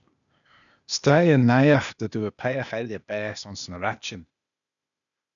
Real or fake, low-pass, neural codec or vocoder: fake; 7.2 kHz; codec, 16 kHz, 0.8 kbps, ZipCodec